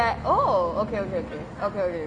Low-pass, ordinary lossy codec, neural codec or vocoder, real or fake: 9.9 kHz; Opus, 32 kbps; none; real